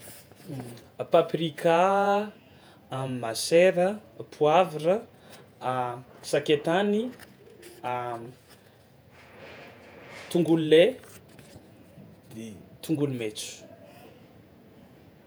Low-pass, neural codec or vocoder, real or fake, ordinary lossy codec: none; vocoder, 48 kHz, 128 mel bands, Vocos; fake; none